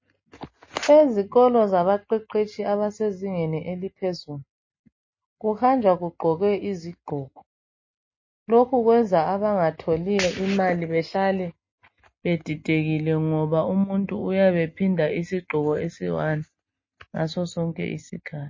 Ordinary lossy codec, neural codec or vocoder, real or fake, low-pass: MP3, 32 kbps; none; real; 7.2 kHz